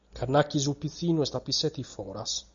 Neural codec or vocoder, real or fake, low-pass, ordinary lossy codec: none; real; 7.2 kHz; MP3, 64 kbps